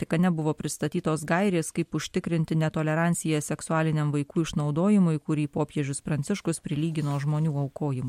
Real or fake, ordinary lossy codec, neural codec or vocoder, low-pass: real; MP3, 64 kbps; none; 19.8 kHz